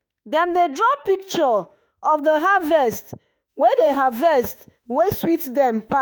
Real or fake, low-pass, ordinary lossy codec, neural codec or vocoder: fake; none; none; autoencoder, 48 kHz, 32 numbers a frame, DAC-VAE, trained on Japanese speech